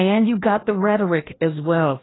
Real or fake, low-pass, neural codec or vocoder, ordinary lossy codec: fake; 7.2 kHz; codec, 16 kHz, 1 kbps, FreqCodec, larger model; AAC, 16 kbps